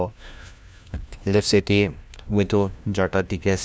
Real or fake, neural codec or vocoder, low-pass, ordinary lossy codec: fake; codec, 16 kHz, 1 kbps, FunCodec, trained on LibriTTS, 50 frames a second; none; none